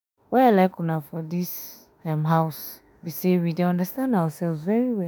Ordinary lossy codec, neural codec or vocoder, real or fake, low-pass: none; autoencoder, 48 kHz, 32 numbers a frame, DAC-VAE, trained on Japanese speech; fake; none